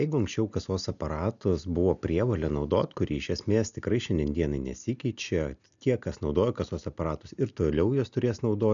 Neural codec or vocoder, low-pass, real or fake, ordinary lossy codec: none; 7.2 kHz; real; MP3, 96 kbps